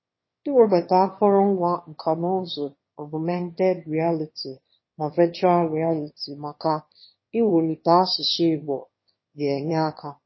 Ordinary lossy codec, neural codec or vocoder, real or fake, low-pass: MP3, 24 kbps; autoencoder, 22.05 kHz, a latent of 192 numbers a frame, VITS, trained on one speaker; fake; 7.2 kHz